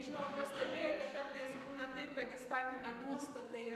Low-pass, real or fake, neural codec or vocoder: 14.4 kHz; fake; codec, 44.1 kHz, 2.6 kbps, SNAC